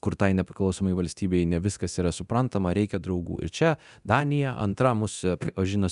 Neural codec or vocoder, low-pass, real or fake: codec, 24 kHz, 0.9 kbps, DualCodec; 10.8 kHz; fake